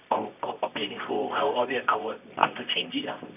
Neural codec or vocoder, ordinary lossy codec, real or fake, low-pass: codec, 24 kHz, 0.9 kbps, WavTokenizer, medium music audio release; none; fake; 3.6 kHz